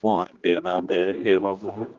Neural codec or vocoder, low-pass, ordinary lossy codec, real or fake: codec, 16 kHz, 2 kbps, X-Codec, HuBERT features, trained on general audio; 7.2 kHz; Opus, 32 kbps; fake